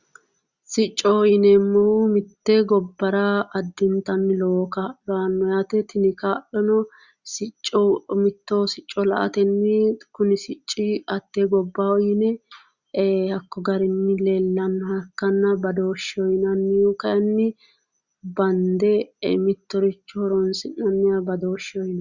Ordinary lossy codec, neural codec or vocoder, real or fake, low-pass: Opus, 64 kbps; none; real; 7.2 kHz